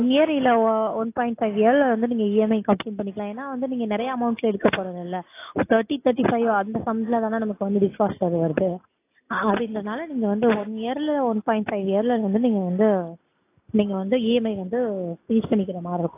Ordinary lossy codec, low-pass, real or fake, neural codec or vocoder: AAC, 24 kbps; 3.6 kHz; real; none